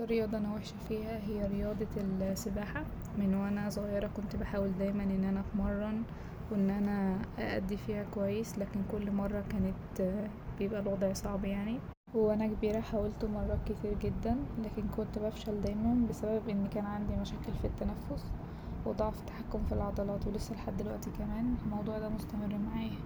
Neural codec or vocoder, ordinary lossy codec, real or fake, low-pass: none; none; real; none